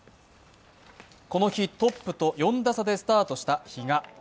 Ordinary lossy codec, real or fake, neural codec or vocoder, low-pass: none; real; none; none